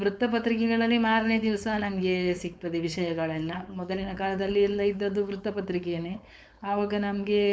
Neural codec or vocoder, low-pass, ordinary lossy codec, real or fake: codec, 16 kHz, 4.8 kbps, FACodec; none; none; fake